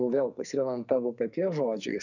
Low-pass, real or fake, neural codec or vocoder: 7.2 kHz; fake; codec, 44.1 kHz, 2.6 kbps, SNAC